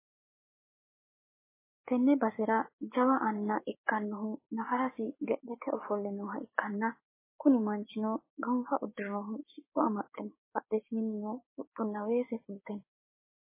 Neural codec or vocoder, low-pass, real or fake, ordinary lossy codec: codec, 16 kHz, 6 kbps, DAC; 3.6 kHz; fake; MP3, 16 kbps